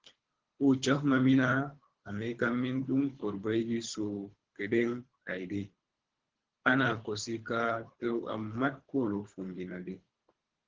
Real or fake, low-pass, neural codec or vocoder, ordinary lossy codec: fake; 7.2 kHz; codec, 24 kHz, 3 kbps, HILCodec; Opus, 16 kbps